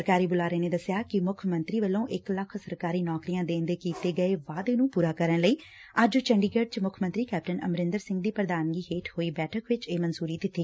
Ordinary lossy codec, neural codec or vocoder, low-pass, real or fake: none; none; none; real